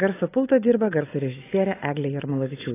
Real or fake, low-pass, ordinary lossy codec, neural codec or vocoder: real; 3.6 kHz; AAC, 16 kbps; none